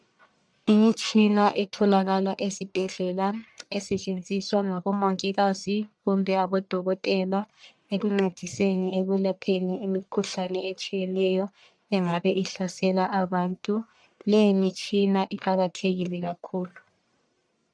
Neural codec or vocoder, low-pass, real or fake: codec, 44.1 kHz, 1.7 kbps, Pupu-Codec; 9.9 kHz; fake